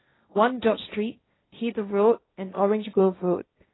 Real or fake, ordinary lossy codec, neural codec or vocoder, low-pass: fake; AAC, 16 kbps; codec, 16 kHz, 1.1 kbps, Voila-Tokenizer; 7.2 kHz